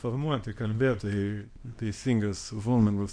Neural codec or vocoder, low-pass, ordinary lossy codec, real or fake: codec, 24 kHz, 0.9 kbps, WavTokenizer, small release; 9.9 kHz; MP3, 48 kbps; fake